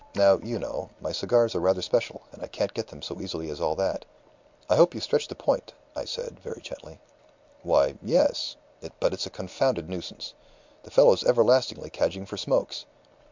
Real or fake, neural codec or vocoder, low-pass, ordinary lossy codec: real; none; 7.2 kHz; MP3, 64 kbps